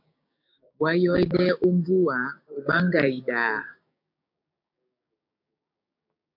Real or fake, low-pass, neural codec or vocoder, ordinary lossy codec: fake; 5.4 kHz; codec, 16 kHz, 6 kbps, DAC; MP3, 48 kbps